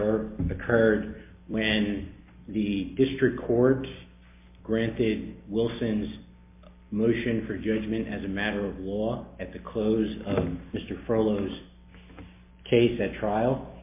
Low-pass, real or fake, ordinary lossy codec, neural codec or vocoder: 3.6 kHz; real; MP3, 24 kbps; none